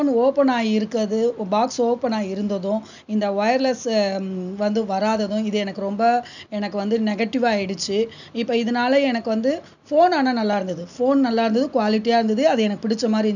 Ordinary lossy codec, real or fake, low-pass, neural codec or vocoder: none; real; 7.2 kHz; none